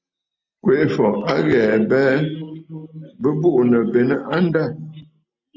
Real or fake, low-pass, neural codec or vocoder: real; 7.2 kHz; none